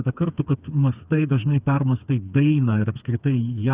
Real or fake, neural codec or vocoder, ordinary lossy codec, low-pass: fake; codec, 16 kHz, 4 kbps, FreqCodec, smaller model; Opus, 64 kbps; 3.6 kHz